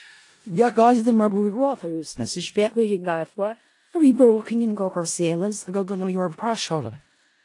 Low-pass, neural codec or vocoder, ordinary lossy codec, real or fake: 10.8 kHz; codec, 16 kHz in and 24 kHz out, 0.4 kbps, LongCat-Audio-Codec, four codebook decoder; AAC, 48 kbps; fake